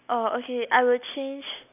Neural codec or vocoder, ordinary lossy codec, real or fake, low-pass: none; none; real; 3.6 kHz